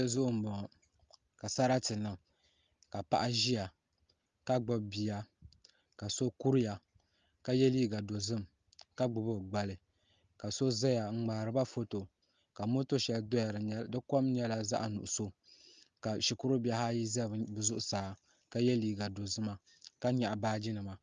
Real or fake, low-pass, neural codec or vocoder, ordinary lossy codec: real; 7.2 kHz; none; Opus, 32 kbps